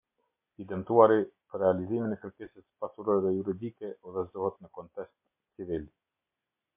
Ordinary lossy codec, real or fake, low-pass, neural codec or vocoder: MP3, 32 kbps; real; 3.6 kHz; none